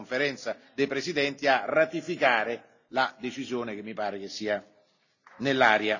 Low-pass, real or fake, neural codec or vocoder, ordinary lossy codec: 7.2 kHz; real; none; none